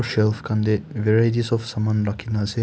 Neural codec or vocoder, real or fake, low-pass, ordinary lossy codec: none; real; none; none